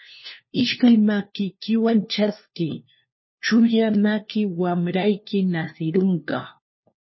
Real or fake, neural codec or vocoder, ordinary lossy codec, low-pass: fake; codec, 16 kHz, 1 kbps, FunCodec, trained on LibriTTS, 50 frames a second; MP3, 24 kbps; 7.2 kHz